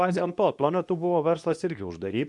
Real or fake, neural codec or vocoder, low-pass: fake; codec, 24 kHz, 0.9 kbps, WavTokenizer, medium speech release version 2; 10.8 kHz